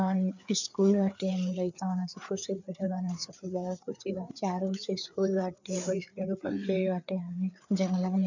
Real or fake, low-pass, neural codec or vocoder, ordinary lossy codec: fake; 7.2 kHz; codec, 16 kHz, 4 kbps, FreqCodec, larger model; none